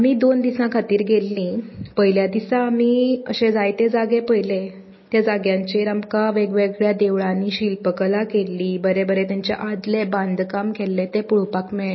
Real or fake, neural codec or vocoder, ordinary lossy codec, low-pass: real; none; MP3, 24 kbps; 7.2 kHz